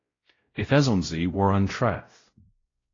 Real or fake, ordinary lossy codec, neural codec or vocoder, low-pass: fake; AAC, 32 kbps; codec, 16 kHz, 0.5 kbps, X-Codec, WavLM features, trained on Multilingual LibriSpeech; 7.2 kHz